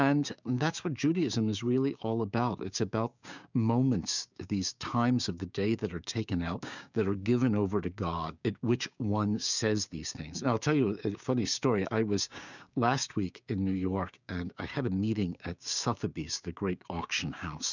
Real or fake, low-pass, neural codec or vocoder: fake; 7.2 kHz; codec, 16 kHz, 6 kbps, DAC